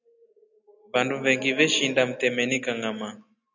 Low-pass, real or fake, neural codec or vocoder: 7.2 kHz; real; none